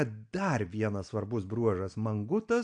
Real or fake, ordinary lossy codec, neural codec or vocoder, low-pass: real; AAC, 64 kbps; none; 9.9 kHz